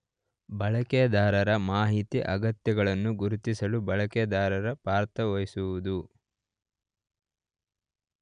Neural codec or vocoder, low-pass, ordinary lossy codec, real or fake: none; 9.9 kHz; none; real